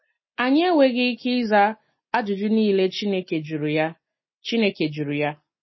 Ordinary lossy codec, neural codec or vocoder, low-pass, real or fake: MP3, 24 kbps; none; 7.2 kHz; real